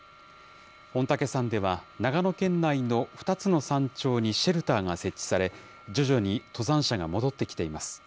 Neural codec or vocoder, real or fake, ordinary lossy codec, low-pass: none; real; none; none